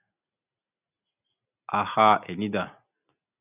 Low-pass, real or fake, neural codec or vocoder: 3.6 kHz; real; none